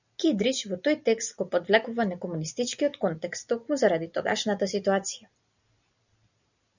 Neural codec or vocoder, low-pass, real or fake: none; 7.2 kHz; real